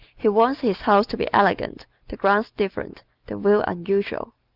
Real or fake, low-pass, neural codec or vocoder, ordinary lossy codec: real; 5.4 kHz; none; Opus, 24 kbps